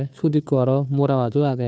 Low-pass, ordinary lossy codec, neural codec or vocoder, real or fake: none; none; codec, 16 kHz, 2 kbps, FunCodec, trained on Chinese and English, 25 frames a second; fake